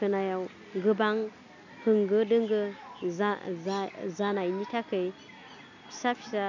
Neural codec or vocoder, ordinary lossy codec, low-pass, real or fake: none; none; 7.2 kHz; real